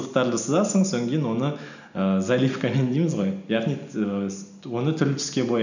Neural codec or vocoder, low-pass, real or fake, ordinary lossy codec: none; 7.2 kHz; real; none